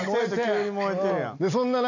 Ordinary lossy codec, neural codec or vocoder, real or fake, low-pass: none; none; real; 7.2 kHz